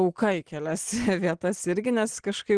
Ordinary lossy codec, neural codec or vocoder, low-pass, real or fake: Opus, 16 kbps; none; 9.9 kHz; real